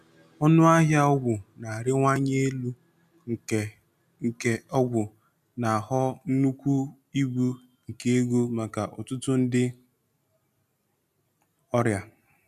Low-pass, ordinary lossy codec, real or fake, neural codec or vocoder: 14.4 kHz; none; real; none